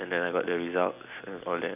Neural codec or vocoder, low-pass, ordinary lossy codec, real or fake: none; 3.6 kHz; none; real